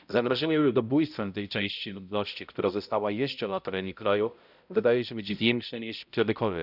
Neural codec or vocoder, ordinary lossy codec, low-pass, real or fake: codec, 16 kHz, 0.5 kbps, X-Codec, HuBERT features, trained on balanced general audio; none; 5.4 kHz; fake